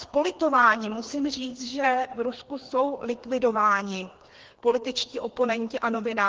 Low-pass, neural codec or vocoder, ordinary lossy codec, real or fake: 7.2 kHz; codec, 16 kHz, 2 kbps, FreqCodec, larger model; Opus, 16 kbps; fake